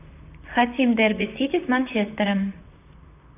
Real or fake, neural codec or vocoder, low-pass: fake; vocoder, 44.1 kHz, 128 mel bands, Pupu-Vocoder; 3.6 kHz